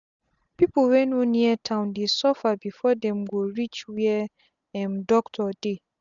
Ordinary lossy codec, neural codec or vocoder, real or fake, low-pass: none; none; real; 7.2 kHz